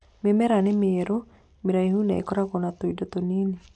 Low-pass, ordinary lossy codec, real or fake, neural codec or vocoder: 10.8 kHz; none; real; none